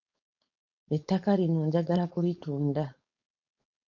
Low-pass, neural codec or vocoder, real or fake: 7.2 kHz; codec, 16 kHz, 4.8 kbps, FACodec; fake